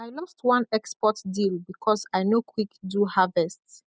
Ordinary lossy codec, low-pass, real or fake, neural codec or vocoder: none; none; real; none